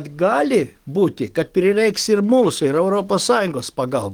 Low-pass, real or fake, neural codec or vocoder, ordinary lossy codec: 19.8 kHz; fake; codec, 44.1 kHz, 7.8 kbps, DAC; Opus, 24 kbps